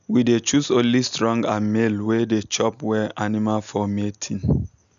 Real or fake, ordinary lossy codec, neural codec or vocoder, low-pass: real; none; none; 7.2 kHz